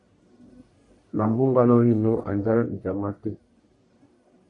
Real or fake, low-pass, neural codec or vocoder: fake; 10.8 kHz; codec, 44.1 kHz, 1.7 kbps, Pupu-Codec